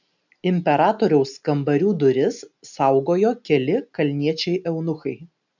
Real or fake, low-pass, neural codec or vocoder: real; 7.2 kHz; none